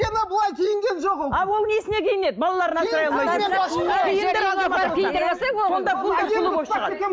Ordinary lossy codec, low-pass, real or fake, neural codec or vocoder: none; none; real; none